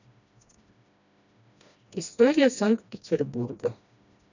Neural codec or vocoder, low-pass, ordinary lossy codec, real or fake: codec, 16 kHz, 1 kbps, FreqCodec, smaller model; 7.2 kHz; none; fake